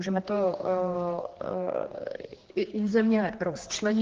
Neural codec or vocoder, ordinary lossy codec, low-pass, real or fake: codec, 16 kHz, 2 kbps, X-Codec, HuBERT features, trained on general audio; Opus, 16 kbps; 7.2 kHz; fake